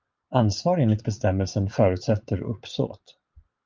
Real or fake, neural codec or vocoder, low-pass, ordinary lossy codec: fake; vocoder, 22.05 kHz, 80 mel bands, Vocos; 7.2 kHz; Opus, 24 kbps